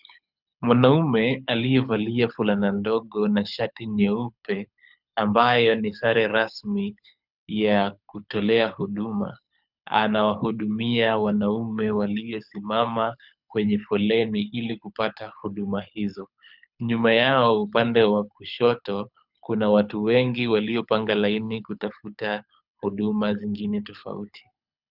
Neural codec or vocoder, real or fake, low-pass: codec, 24 kHz, 6 kbps, HILCodec; fake; 5.4 kHz